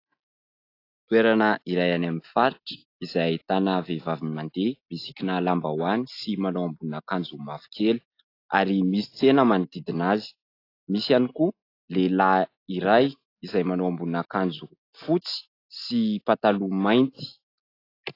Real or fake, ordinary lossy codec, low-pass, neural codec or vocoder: real; AAC, 32 kbps; 5.4 kHz; none